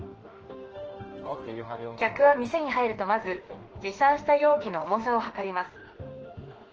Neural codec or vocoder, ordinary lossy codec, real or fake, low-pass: autoencoder, 48 kHz, 32 numbers a frame, DAC-VAE, trained on Japanese speech; Opus, 16 kbps; fake; 7.2 kHz